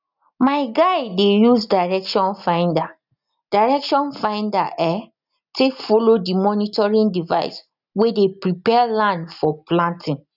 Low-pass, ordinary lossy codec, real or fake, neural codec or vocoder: 5.4 kHz; none; real; none